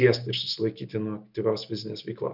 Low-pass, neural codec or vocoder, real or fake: 5.4 kHz; none; real